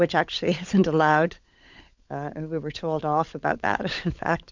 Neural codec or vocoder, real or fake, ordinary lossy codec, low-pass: codec, 16 kHz, 16 kbps, FunCodec, trained on LibriTTS, 50 frames a second; fake; MP3, 64 kbps; 7.2 kHz